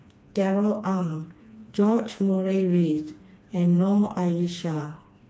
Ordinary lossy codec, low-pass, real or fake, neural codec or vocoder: none; none; fake; codec, 16 kHz, 2 kbps, FreqCodec, smaller model